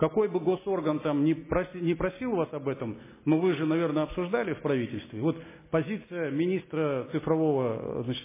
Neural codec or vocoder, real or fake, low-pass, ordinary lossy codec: none; real; 3.6 kHz; MP3, 16 kbps